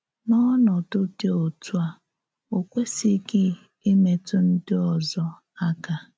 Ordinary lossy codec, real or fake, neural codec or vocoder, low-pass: none; real; none; none